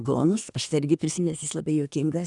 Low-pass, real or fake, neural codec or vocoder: 10.8 kHz; fake; codec, 24 kHz, 1 kbps, SNAC